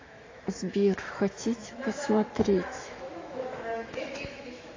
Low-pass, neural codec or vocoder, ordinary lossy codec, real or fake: 7.2 kHz; autoencoder, 48 kHz, 128 numbers a frame, DAC-VAE, trained on Japanese speech; AAC, 32 kbps; fake